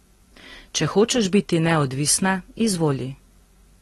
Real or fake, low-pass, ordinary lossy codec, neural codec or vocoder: real; 19.8 kHz; AAC, 32 kbps; none